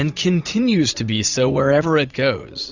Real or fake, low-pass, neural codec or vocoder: fake; 7.2 kHz; vocoder, 44.1 kHz, 80 mel bands, Vocos